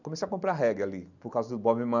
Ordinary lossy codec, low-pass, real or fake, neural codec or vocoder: none; 7.2 kHz; real; none